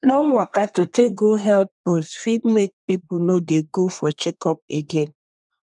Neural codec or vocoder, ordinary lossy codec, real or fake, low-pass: codec, 24 kHz, 1 kbps, SNAC; none; fake; 10.8 kHz